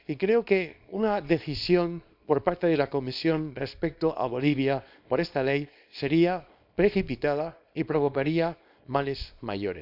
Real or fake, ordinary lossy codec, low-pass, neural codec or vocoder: fake; none; 5.4 kHz; codec, 24 kHz, 0.9 kbps, WavTokenizer, small release